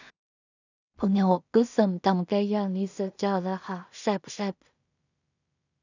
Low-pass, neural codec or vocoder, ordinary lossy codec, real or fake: 7.2 kHz; codec, 16 kHz in and 24 kHz out, 0.4 kbps, LongCat-Audio-Codec, two codebook decoder; none; fake